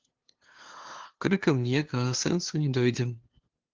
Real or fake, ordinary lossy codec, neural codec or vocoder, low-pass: fake; Opus, 16 kbps; codec, 16 kHz, 2 kbps, FunCodec, trained on LibriTTS, 25 frames a second; 7.2 kHz